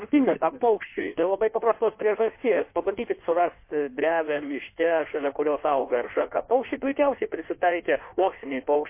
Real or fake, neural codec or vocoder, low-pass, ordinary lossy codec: fake; codec, 16 kHz in and 24 kHz out, 1.1 kbps, FireRedTTS-2 codec; 3.6 kHz; MP3, 24 kbps